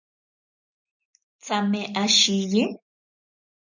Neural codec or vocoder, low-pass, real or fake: none; 7.2 kHz; real